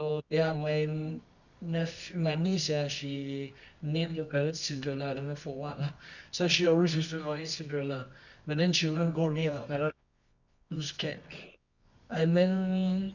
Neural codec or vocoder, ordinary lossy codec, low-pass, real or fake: codec, 24 kHz, 0.9 kbps, WavTokenizer, medium music audio release; none; 7.2 kHz; fake